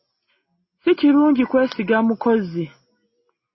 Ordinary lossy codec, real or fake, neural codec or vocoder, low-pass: MP3, 24 kbps; real; none; 7.2 kHz